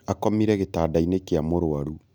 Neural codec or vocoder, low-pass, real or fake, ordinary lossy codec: none; none; real; none